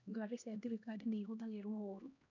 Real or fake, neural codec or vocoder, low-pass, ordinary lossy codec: fake; codec, 16 kHz, 1 kbps, X-Codec, HuBERT features, trained on LibriSpeech; 7.2 kHz; none